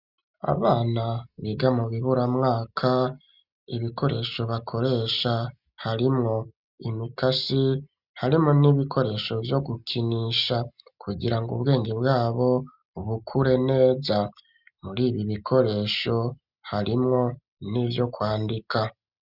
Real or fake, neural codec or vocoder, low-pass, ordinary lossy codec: real; none; 5.4 kHz; Opus, 64 kbps